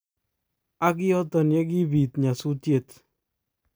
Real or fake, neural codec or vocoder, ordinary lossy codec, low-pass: real; none; none; none